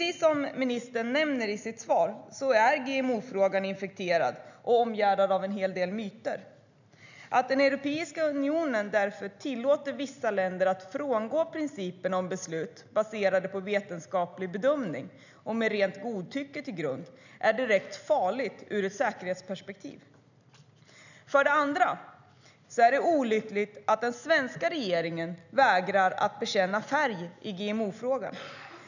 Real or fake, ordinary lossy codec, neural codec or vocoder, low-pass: real; none; none; 7.2 kHz